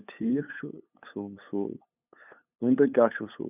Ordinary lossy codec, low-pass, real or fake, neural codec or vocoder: none; 3.6 kHz; fake; codec, 16 kHz, 8 kbps, FunCodec, trained on LibriTTS, 25 frames a second